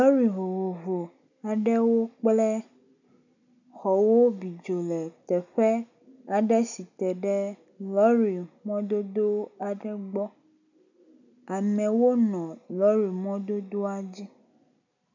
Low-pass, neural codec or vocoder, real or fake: 7.2 kHz; none; real